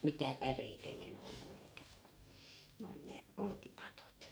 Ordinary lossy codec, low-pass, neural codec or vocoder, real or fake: none; none; codec, 44.1 kHz, 2.6 kbps, DAC; fake